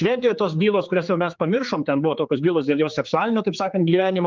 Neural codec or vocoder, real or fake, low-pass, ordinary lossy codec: codec, 16 kHz, 4 kbps, X-Codec, HuBERT features, trained on general audio; fake; 7.2 kHz; Opus, 32 kbps